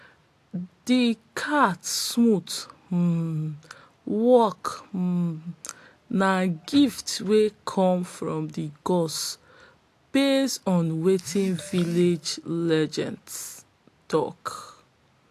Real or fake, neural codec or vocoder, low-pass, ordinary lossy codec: real; none; 14.4 kHz; AAC, 64 kbps